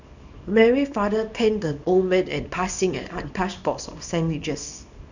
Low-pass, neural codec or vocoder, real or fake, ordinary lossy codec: 7.2 kHz; codec, 24 kHz, 0.9 kbps, WavTokenizer, small release; fake; none